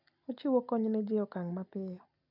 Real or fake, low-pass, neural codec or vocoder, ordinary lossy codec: real; 5.4 kHz; none; none